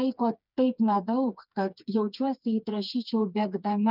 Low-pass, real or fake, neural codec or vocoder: 5.4 kHz; fake; codec, 16 kHz, 4 kbps, FreqCodec, smaller model